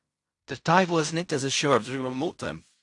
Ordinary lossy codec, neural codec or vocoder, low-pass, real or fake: AAC, 64 kbps; codec, 16 kHz in and 24 kHz out, 0.4 kbps, LongCat-Audio-Codec, fine tuned four codebook decoder; 10.8 kHz; fake